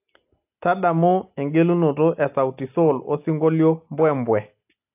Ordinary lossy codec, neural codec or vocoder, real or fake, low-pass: AAC, 32 kbps; none; real; 3.6 kHz